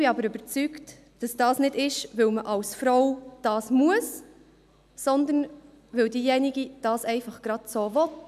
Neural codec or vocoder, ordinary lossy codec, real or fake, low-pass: none; none; real; 14.4 kHz